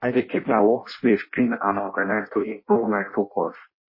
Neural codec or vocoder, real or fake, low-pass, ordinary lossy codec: codec, 16 kHz in and 24 kHz out, 0.6 kbps, FireRedTTS-2 codec; fake; 5.4 kHz; MP3, 24 kbps